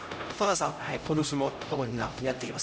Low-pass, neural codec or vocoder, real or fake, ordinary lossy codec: none; codec, 16 kHz, 0.5 kbps, X-Codec, HuBERT features, trained on LibriSpeech; fake; none